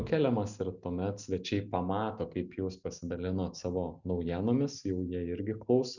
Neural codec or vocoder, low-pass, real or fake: none; 7.2 kHz; real